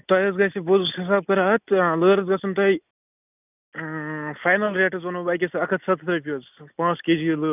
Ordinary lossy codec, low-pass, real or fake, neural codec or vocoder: none; 3.6 kHz; real; none